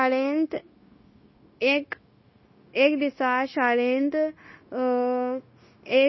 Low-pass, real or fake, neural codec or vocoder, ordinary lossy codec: 7.2 kHz; fake; codec, 16 kHz, 0.9 kbps, LongCat-Audio-Codec; MP3, 24 kbps